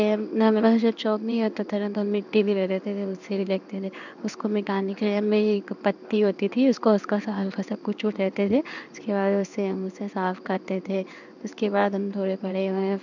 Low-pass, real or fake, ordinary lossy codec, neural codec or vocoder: 7.2 kHz; fake; none; codec, 16 kHz in and 24 kHz out, 1 kbps, XY-Tokenizer